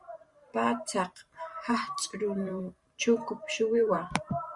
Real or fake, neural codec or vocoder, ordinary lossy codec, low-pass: fake; vocoder, 44.1 kHz, 128 mel bands every 512 samples, BigVGAN v2; Opus, 64 kbps; 10.8 kHz